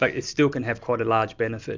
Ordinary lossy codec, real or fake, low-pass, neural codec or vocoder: MP3, 64 kbps; real; 7.2 kHz; none